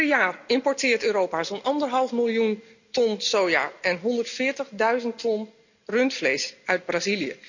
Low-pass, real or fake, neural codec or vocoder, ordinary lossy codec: 7.2 kHz; real; none; none